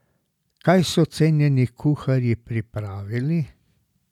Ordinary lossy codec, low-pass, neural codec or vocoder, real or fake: none; 19.8 kHz; none; real